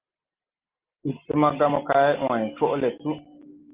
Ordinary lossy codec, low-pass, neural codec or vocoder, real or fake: Opus, 32 kbps; 3.6 kHz; none; real